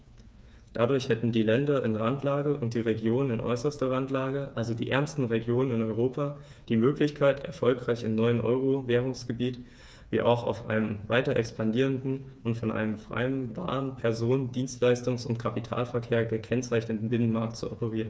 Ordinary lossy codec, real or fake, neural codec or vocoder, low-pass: none; fake; codec, 16 kHz, 4 kbps, FreqCodec, smaller model; none